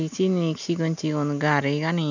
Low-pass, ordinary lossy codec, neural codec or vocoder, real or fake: 7.2 kHz; none; none; real